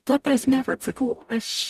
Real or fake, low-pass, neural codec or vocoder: fake; 14.4 kHz; codec, 44.1 kHz, 0.9 kbps, DAC